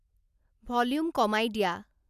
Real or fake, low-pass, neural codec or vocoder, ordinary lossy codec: real; 14.4 kHz; none; none